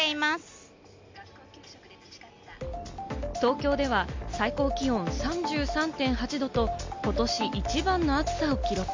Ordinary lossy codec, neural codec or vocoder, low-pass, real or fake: MP3, 48 kbps; none; 7.2 kHz; real